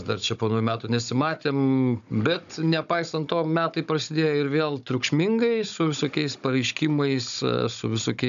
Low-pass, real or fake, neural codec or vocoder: 7.2 kHz; fake; codec, 16 kHz, 16 kbps, FunCodec, trained on Chinese and English, 50 frames a second